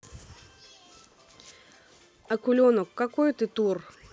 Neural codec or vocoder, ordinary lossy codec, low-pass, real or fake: none; none; none; real